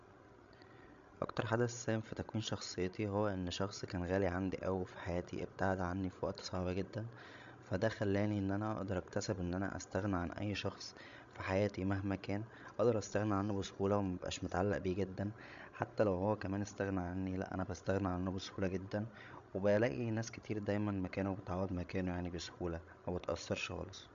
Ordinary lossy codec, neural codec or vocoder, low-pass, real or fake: MP3, 64 kbps; codec, 16 kHz, 16 kbps, FreqCodec, larger model; 7.2 kHz; fake